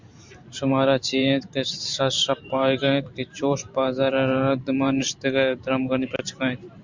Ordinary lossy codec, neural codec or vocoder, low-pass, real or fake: MP3, 64 kbps; vocoder, 44.1 kHz, 128 mel bands every 512 samples, BigVGAN v2; 7.2 kHz; fake